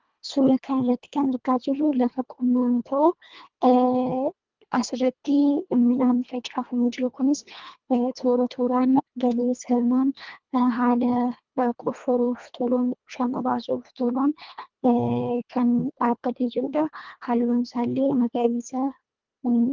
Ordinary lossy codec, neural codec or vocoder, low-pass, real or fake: Opus, 32 kbps; codec, 24 kHz, 1.5 kbps, HILCodec; 7.2 kHz; fake